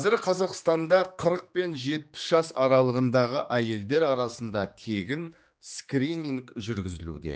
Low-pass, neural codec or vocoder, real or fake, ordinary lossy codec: none; codec, 16 kHz, 2 kbps, X-Codec, HuBERT features, trained on general audio; fake; none